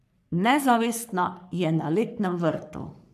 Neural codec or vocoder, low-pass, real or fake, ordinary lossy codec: codec, 44.1 kHz, 3.4 kbps, Pupu-Codec; 14.4 kHz; fake; none